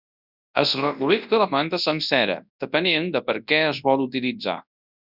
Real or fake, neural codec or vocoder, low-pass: fake; codec, 24 kHz, 0.9 kbps, WavTokenizer, large speech release; 5.4 kHz